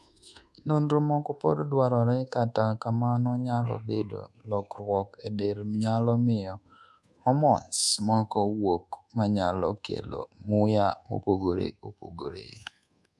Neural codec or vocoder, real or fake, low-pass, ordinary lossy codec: codec, 24 kHz, 1.2 kbps, DualCodec; fake; none; none